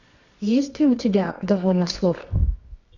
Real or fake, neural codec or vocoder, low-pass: fake; codec, 24 kHz, 0.9 kbps, WavTokenizer, medium music audio release; 7.2 kHz